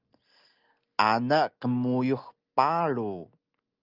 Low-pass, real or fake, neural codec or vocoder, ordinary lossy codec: 5.4 kHz; real; none; Opus, 24 kbps